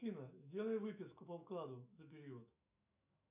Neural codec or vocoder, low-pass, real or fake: autoencoder, 48 kHz, 128 numbers a frame, DAC-VAE, trained on Japanese speech; 3.6 kHz; fake